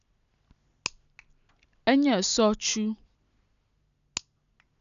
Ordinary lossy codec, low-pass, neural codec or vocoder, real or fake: none; 7.2 kHz; none; real